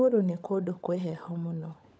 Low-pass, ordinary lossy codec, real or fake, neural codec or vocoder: none; none; fake; codec, 16 kHz, 16 kbps, FunCodec, trained on LibriTTS, 50 frames a second